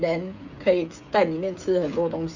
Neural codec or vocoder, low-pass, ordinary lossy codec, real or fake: codec, 16 kHz, 8 kbps, FreqCodec, smaller model; 7.2 kHz; none; fake